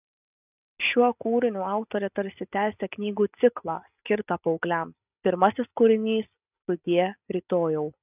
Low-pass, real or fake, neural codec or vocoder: 3.6 kHz; real; none